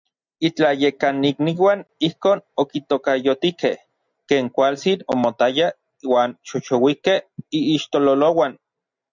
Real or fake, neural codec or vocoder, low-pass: real; none; 7.2 kHz